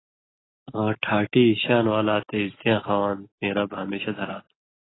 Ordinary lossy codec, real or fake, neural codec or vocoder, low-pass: AAC, 16 kbps; real; none; 7.2 kHz